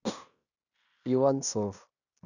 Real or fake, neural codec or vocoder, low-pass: fake; codec, 16 kHz in and 24 kHz out, 0.9 kbps, LongCat-Audio-Codec, fine tuned four codebook decoder; 7.2 kHz